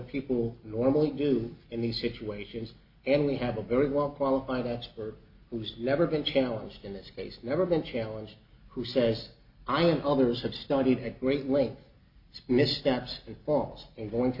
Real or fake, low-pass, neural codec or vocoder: real; 5.4 kHz; none